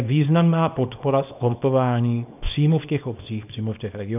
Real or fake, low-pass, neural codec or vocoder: fake; 3.6 kHz; codec, 24 kHz, 0.9 kbps, WavTokenizer, small release